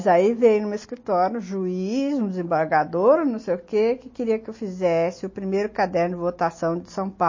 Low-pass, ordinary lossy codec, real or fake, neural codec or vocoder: 7.2 kHz; MP3, 32 kbps; real; none